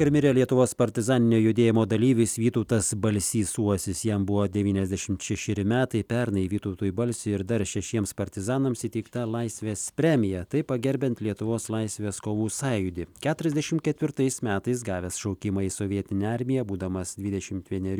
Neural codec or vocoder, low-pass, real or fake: none; 19.8 kHz; real